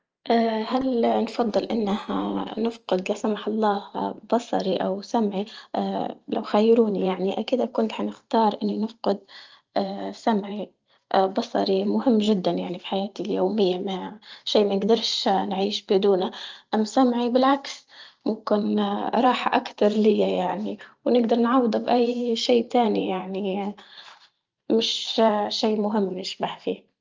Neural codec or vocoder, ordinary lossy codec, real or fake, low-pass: vocoder, 44.1 kHz, 128 mel bands every 512 samples, BigVGAN v2; Opus, 24 kbps; fake; 7.2 kHz